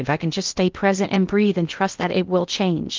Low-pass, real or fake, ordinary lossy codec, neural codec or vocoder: 7.2 kHz; fake; Opus, 24 kbps; codec, 16 kHz in and 24 kHz out, 0.6 kbps, FocalCodec, streaming, 2048 codes